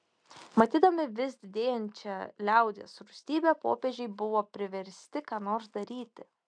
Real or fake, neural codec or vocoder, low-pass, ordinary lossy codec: real; none; 9.9 kHz; MP3, 96 kbps